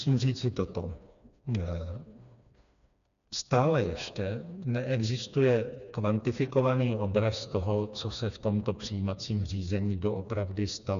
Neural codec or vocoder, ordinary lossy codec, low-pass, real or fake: codec, 16 kHz, 2 kbps, FreqCodec, smaller model; MP3, 96 kbps; 7.2 kHz; fake